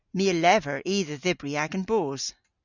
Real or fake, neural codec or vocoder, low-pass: real; none; 7.2 kHz